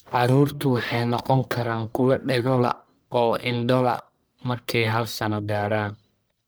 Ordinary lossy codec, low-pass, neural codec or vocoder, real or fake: none; none; codec, 44.1 kHz, 1.7 kbps, Pupu-Codec; fake